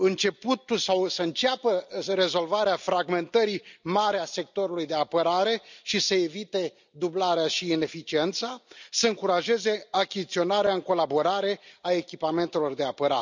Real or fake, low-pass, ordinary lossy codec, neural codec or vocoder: real; 7.2 kHz; none; none